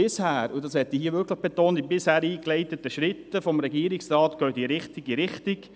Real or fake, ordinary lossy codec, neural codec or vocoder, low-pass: real; none; none; none